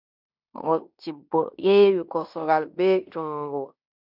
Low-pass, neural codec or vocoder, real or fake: 5.4 kHz; codec, 16 kHz in and 24 kHz out, 0.9 kbps, LongCat-Audio-Codec, fine tuned four codebook decoder; fake